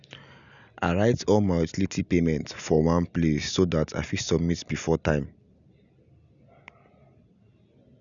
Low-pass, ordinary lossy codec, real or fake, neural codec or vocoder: 7.2 kHz; none; real; none